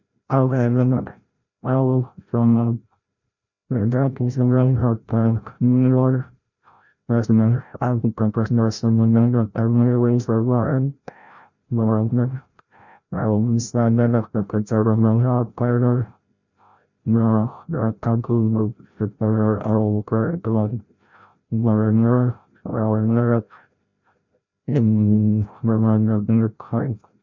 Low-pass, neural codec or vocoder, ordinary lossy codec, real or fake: 7.2 kHz; codec, 16 kHz, 0.5 kbps, FreqCodec, larger model; none; fake